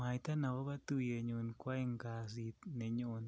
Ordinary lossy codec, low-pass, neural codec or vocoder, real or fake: none; none; none; real